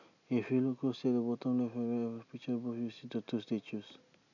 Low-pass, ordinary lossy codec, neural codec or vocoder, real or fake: 7.2 kHz; none; none; real